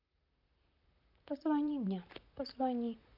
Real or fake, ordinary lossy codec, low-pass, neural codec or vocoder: fake; none; 5.4 kHz; vocoder, 44.1 kHz, 128 mel bands, Pupu-Vocoder